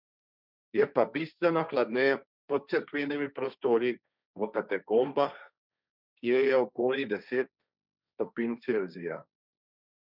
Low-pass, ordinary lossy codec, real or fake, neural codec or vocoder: 5.4 kHz; none; fake; codec, 16 kHz, 1.1 kbps, Voila-Tokenizer